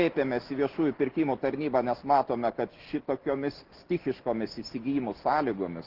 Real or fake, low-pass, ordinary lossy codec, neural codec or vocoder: real; 5.4 kHz; Opus, 16 kbps; none